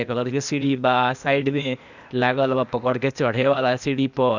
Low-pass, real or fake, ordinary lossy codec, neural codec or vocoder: 7.2 kHz; fake; none; codec, 16 kHz, 0.8 kbps, ZipCodec